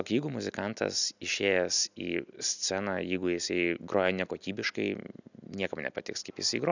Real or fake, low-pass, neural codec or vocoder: real; 7.2 kHz; none